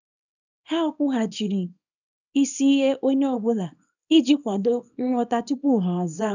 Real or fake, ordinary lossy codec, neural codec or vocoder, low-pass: fake; none; codec, 24 kHz, 0.9 kbps, WavTokenizer, small release; 7.2 kHz